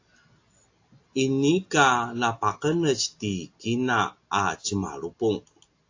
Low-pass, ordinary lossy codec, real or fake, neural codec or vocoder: 7.2 kHz; AAC, 48 kbps; real; none